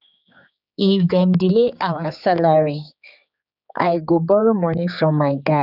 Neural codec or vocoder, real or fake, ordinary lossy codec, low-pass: codec, 16 kHz, 4 kbps, X-Codec, HuBERT features, trained on general audio; fake; none; 5.4 kHz